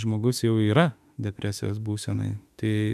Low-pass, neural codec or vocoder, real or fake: 14.4 kHz; autoencoder, 48 kHz, 32 numbers a frame, DAC-VAE, trained on Japanese speech; fake